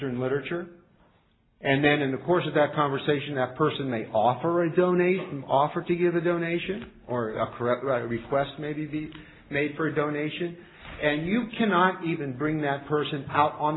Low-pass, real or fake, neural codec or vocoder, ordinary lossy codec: 7.2 kHz; real; none; AAC, 16 kbps